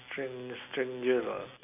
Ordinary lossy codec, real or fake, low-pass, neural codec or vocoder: none; real; 3.6 kHz; none